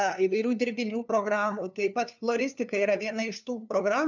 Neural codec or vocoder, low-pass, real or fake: codec, 16 kHz, 2 kbps, FunCodec, trained on LibriTTS, 25 frames a second; 7.2 kHz; fake